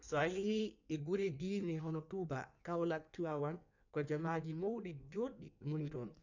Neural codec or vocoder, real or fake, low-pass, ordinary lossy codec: codec, 16 kHz in and 24 kHz out, 1.1 kbps, FireRedTTS-2 codec; fake; 7.2 kHz; none